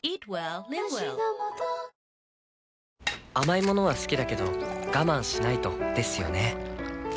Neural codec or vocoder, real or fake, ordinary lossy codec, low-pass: none; real; none; none